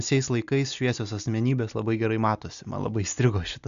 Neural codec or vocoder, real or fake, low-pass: none; real; 7.2 kHz